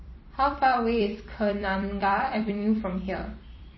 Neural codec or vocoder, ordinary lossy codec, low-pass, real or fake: vocoder, 44.1 kHz, 128 mel bands every 256 samples, BigVGAN v2; MP3, 24 kbps; 7.2 kHz; fake